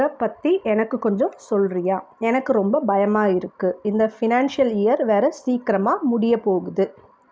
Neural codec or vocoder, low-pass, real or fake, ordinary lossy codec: none; none; real; none